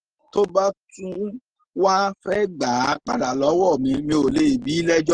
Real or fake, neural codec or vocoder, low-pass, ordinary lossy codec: real; none; 9.9 kHz; Opus, 16 kbps